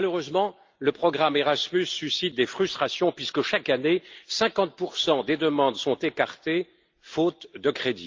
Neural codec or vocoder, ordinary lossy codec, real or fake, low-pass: none; Opus, 32 kbps; real; 7.2 kHz